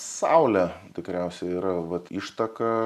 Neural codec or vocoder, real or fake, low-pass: none; real; 14.4 kHz